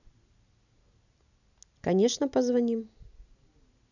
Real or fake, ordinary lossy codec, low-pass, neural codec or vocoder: real; none; 7.2 kHz; none